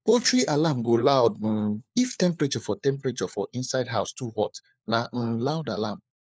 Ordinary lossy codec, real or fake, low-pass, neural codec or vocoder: none; fake; none; codec, 16 kHz, 4 kbps, FunCodec, trained on LibriTTS, 50 frames a second